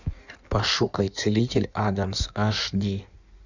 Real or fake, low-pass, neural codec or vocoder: fake; 7.2 kHz; codec, 16 kHz in and 24 kHz out, 1.1 kbps, FireRedTTS-2 codec